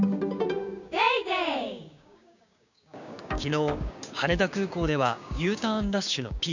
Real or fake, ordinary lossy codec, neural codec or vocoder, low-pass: fake; none; codec, 16 kHz, 6 kbps, DAC; 7.2 kHz